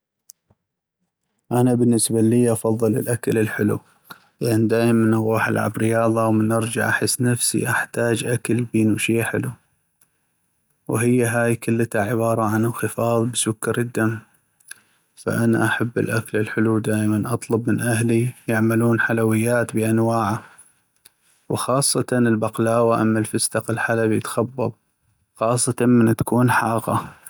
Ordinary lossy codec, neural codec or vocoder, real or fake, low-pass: none; none; real; none